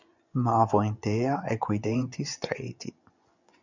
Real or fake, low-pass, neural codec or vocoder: fake; 7.2 kHz; vocoder, 24 kHz, 100 mel bands, Vocos